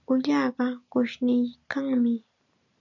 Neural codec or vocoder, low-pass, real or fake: none; 7.2 kHz; real